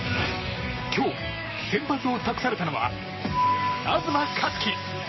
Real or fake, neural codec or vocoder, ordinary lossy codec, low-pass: fake; codec, 44.1 kHz, 7.8 kbps, Pupu-Codec; MP3, 24 kbps; 7.2 kHz